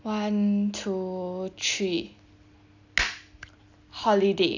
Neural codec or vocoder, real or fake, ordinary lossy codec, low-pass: none; real; AAC, 48 kbps; 7.2 kHz